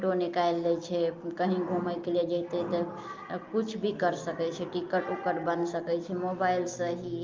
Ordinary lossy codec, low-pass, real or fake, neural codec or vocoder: Opus, 24 kbps; 7.2 kHz; real; none